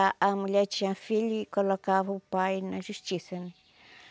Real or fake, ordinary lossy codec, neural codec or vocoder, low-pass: real; none; none; none